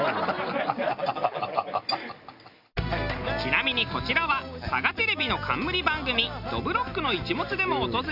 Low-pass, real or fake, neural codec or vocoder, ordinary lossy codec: 5.4 kHz; real; none; none